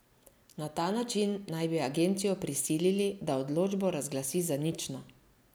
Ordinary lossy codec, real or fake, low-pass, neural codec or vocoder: none; real; none; none